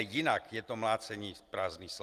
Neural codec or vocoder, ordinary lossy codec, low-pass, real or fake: none; Opus, 24 kbps; 14.4 kHz; real